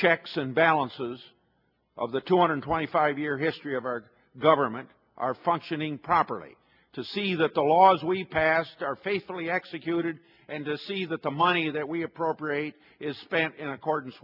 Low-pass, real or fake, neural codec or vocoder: 5.4 kHz; real; none